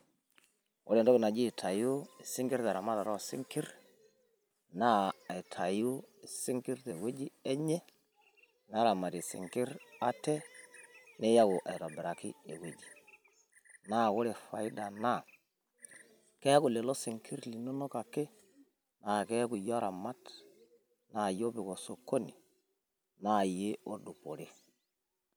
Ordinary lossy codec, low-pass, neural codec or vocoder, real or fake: none; none; none; real